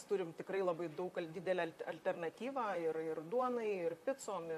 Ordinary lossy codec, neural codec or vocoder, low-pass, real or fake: MP3, 64 kbps; vocoder, 44.1 kHz, 128 mel bands, Pupu-Vocoder; 14.4 kHz; fake